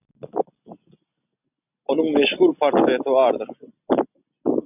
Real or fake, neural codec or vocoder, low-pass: real; none; 3.6 kHz